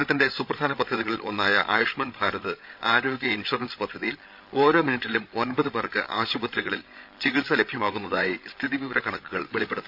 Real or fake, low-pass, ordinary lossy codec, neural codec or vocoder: fake; 5.4 kHz; none; codec, 16 kHz, 16 kbps, FreqCodec, larger model